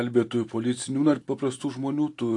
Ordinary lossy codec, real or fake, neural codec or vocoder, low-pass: AAC, 48 kbps; real; none; 10.8 kHz